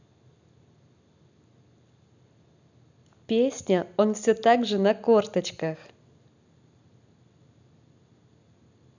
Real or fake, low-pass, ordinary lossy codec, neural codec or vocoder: real; 7.2 kHz; none; none